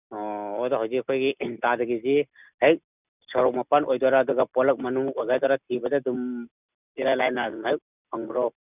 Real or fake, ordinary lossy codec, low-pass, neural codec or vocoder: real; none; 3.6 kHz; none